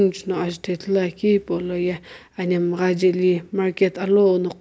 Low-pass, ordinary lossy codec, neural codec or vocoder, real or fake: none; none; none; real